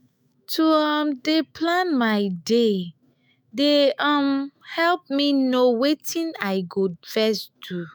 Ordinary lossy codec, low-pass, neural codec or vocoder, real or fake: none; none; autoencoder, 48 kHz, 128 numbers a frame, DAC-VAE, trained on Japanese speech; fake